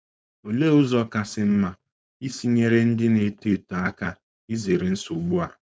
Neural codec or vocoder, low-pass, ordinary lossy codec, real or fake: codec, 16 kHz, 4.8 kbps, FACodec; none; none; fake